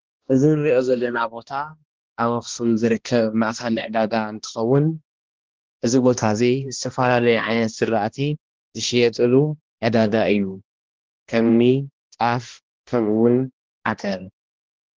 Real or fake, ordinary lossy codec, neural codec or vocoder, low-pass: fake; Opus, 16 kbps; codec, 16 kHz, 1 kbps, X-Codec, HuBERT features, trained on balanced general audio; 7.2 kHz